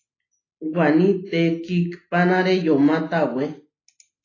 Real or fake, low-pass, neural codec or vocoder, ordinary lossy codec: real; 7.2 kHz; none; AAC, 32 kbps